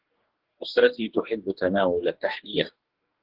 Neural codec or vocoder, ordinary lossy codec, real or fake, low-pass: codec, 44.1 kHz, 2.6 kbps, DAC; Opus, 16 kbps; fake; 5.4 kHz